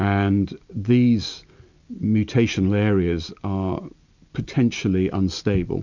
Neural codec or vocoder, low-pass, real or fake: none; 7.2 kHz; real